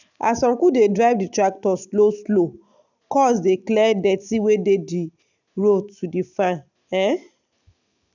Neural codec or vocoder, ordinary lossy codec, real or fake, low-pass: none; none; real; 7.2 kHz